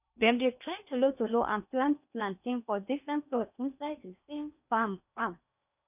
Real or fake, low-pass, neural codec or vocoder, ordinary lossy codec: fake; 3.6 kHz; codec, 16 kHz in and 24 kHz out, 0.8 kbps, FocalCodec, streaming, 65536 codes; none